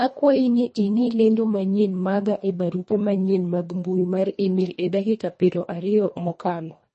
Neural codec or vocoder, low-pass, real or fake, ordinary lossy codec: codec, 24 kHz, 1.5 kbps, HILCodec; 10.8 kHz; fake; MP3, 32 kbps